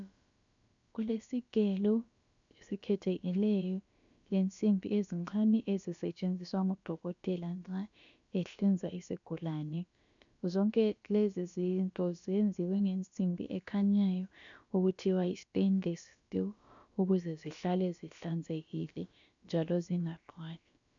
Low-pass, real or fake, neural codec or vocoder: 7.2 kHz; fake; codec, 16 kHz, about 1 kbps, DyCAST, with the encoder's durations